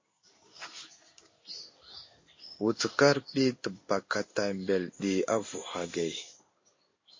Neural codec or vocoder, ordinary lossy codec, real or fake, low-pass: codec, 16 kHz in and 24 kHz out, 1 kbps, XY-Tokenizer; MP3, 32 kbps; fake; 7.2 kHz